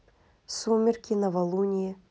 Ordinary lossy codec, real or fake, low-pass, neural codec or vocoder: none; real; none; none